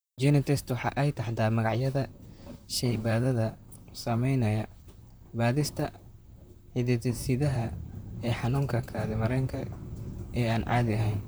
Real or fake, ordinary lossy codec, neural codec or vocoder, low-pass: fake; none; vocoder, 44.1 kHz, 128 mel bands, Pupu-Vocoder; none